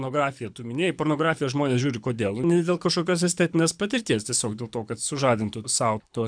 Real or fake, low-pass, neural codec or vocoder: fake; 9.9 kHz; codec, 24 kHz, 6 kbps, HILCodec